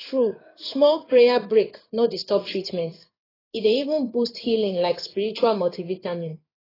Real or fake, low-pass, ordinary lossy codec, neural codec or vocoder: fake; 5.4 kHz; AAC, 24 kbps; codec, 16 kHz in and 24 kHz out, 1 kbps, XY-Tokenizer